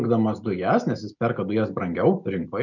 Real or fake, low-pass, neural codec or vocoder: real; 7.2 kHz; none